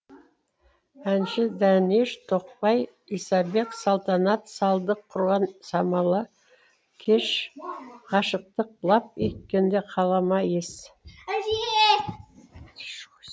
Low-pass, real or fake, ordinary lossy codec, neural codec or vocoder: none; real; none; none